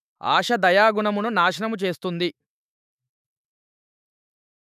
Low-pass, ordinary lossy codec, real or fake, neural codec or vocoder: 14.4 kHz; none; fake; autoencoder, 48 kHz, 128 numbers a frame, DAC-VAE, trained on Japanese speech